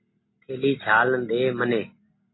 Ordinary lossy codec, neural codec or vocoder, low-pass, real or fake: AAC, 16 kbps; none; 7.2 kHz; real